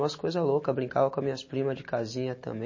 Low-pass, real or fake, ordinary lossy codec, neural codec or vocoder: 7.2 kHz; real; MP3, 32 kbps; none